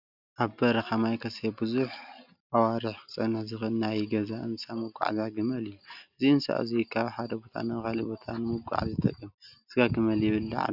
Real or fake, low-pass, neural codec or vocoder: real; 5.4 kHz; none